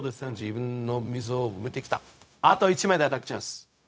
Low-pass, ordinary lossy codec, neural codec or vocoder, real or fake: none; none; codec, 16 kHz, 0.4 kbps, LongCat-Audio-Codec; fake